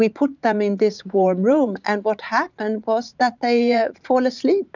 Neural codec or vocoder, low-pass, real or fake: vocoder, 44.1 kHz, 80 mel bands, Vocos; 7.2 kHz; fake